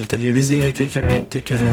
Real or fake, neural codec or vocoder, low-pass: fake; codec, 44.1 kHz, 0.9 kbps, DAC; 19.8 kHz